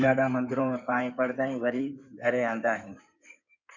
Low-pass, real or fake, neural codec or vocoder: 7.2 kHz; fake; codec, 16 kHz in and 24 kHz out, 2.2 kbps, FireRedTTS-2 codec